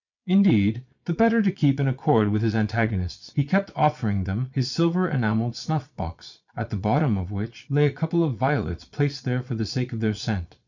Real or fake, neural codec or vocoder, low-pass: fake; vocoder, 22.05 kHz, 80 mel bands, Vocos; 7.2 kHz